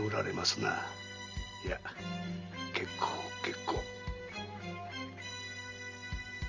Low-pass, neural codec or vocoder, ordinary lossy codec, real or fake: 7.2 kHz; none; Opus, 32 kbps; real